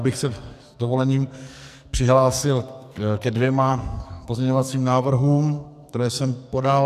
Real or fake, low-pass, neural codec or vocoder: fake; 14.4 kHz; codec, 44.1 kHz, 2.6 kbps, SNAC